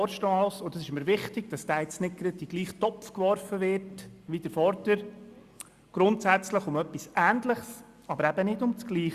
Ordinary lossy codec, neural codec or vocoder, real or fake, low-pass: Opus, 64 kbps; none; real; 14.4 kHz